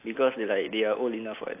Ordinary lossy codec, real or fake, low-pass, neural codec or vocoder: AAC, 32 kbps; real; 3.6 kHz; none